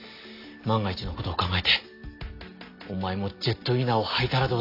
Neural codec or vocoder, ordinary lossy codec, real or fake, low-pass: none; AAC, 32 kbps; real; 5.4 kHz